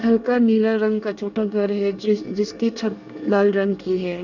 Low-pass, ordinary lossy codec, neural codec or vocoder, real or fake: 7.2 kHz; none; codec, 24 kHz, 1 kbps, SNAC; fake